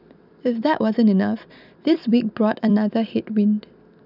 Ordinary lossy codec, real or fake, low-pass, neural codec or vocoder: none; fake; 5.4 kHz; vocoder, 44.1 kHz, 128 mel bands every 256 samples, BigVGAN v2